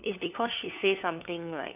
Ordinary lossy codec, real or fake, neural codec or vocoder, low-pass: none; fake; codec, 16 kHz, 4 kbps, FunCodec, trained on LibriTTS, 50 frames a second; 3.6 kHz